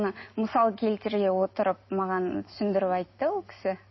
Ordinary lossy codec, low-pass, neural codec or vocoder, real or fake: MP3, 24 kbps; 7.2 kHz; none; real